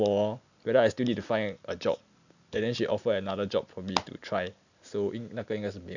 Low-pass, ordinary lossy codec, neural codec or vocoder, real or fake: 7.2 kHz; none; none; real